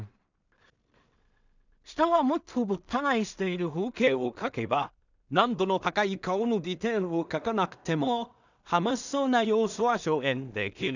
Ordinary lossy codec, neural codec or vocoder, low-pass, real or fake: none; codec, 16 kHz in and 24 kHz out, 0.4 kbps, LongCat-Audio-Codec, two codebook decoder; 7.2 kHz; fake